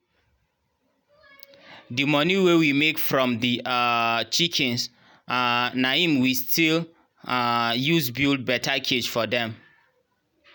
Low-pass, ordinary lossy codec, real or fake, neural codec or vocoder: none; none; real; none